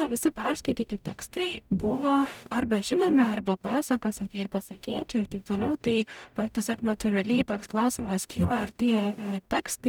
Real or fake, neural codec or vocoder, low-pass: fake; codec, 44.1 kHz, 0.9 kbps, DAC; 19.8 kHz